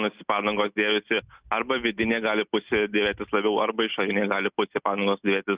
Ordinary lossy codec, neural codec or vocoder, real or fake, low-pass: Opus, 64 kbps; none; real; 3.6 kHz